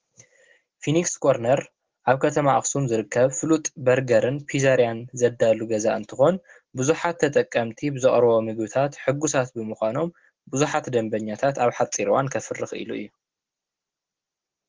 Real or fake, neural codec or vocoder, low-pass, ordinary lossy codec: real; none; 7.2 kHz; Opus, 16 kbps